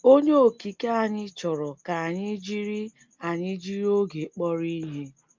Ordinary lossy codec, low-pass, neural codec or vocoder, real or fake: Opus, 24 kbps; 7.2 kHz; none; real